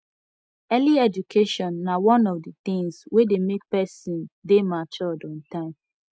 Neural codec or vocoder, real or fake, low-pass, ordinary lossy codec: none; real; none; none